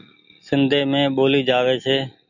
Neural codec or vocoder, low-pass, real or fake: none; 7.2 kHz; real